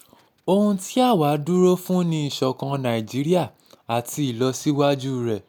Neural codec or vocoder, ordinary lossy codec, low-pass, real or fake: none; none; none; real